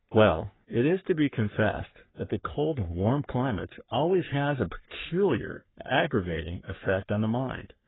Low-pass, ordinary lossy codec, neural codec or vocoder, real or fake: 7.2 kHz; AAC, 16 kbps; codec, 44.1 kHz, 3.4 kbps, Pupu-Codec; fake